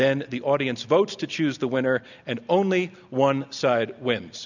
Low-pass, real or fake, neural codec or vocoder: 7.2 kHz; real; none